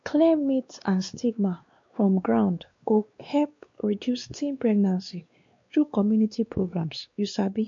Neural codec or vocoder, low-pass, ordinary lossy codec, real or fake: codec, 16 kHz, 2 kbps, X-Codec, WavLM features, trained on Multilingual LibriSpeech; 7.2 kHz; MP3, 48 kbps; fake